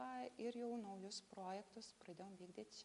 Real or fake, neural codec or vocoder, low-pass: real; none; 10.8 kHz